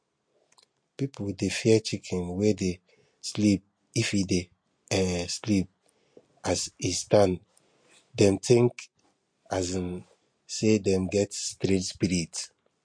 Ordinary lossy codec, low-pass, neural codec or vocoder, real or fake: MP3, 48 kbps; 9.9 kHz; none; real